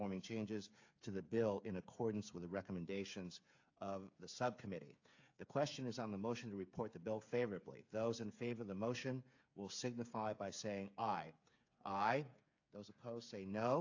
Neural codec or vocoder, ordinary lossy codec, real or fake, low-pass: codec, 16 kHz, 8 kbps, FreqCodec, smaller model; AAC, 48 kbps; fake; 7.2 kHz